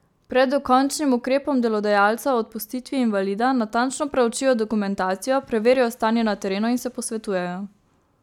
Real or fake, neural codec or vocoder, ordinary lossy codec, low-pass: real; none; none; 19.8 kHz